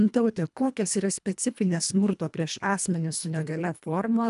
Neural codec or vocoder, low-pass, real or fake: codec, 24 kHz, 1.5 kbps, HILCodec; 10.8 kHz; fake